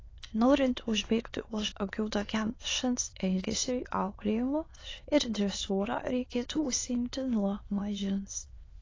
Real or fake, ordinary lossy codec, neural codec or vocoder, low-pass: fake; AAC, 32 kbps; autoencoder, 22.05 kHz, a latent of 192 numbers a frame, VITS, trained on many speakers; 7.2 kHz